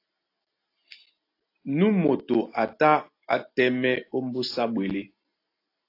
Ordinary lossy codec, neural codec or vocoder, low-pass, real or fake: AAC, 32 kbps; none; 5.4 kHz; real